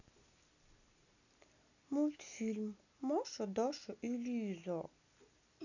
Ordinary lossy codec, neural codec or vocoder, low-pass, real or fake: none; none; 7.2 kHz; real